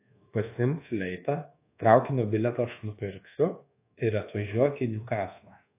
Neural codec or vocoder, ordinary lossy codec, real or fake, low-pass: codec, 24 kHz, 1.2 kbps, DualCodec; AAC, 24 kbps; fake; 3.6 kHz